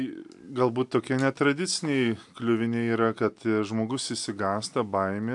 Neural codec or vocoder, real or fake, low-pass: none; real; 10.8 kHz